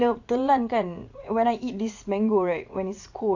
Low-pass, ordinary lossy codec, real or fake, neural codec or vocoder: 7.2 kHz; AAC, 48 kbps; fake; vocoder, 44.1 kHz, 80 mel bands, Vocos